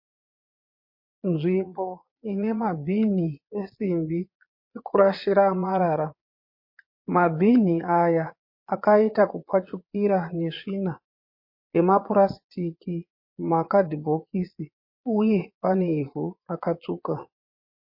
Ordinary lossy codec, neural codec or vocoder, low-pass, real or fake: MP3, 32 kbps; vocoder, 22.05 kHz, 80 mel bands, Vocos; 5.4 kHz; fake